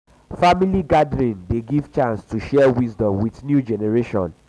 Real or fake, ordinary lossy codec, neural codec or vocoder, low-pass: real; none; none; none